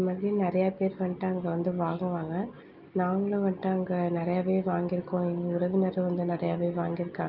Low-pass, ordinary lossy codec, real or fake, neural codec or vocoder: 5.4 kHz; Opus, 24 kbps; real; none